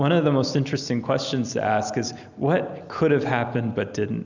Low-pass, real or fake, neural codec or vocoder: 7.2 kHz; real; none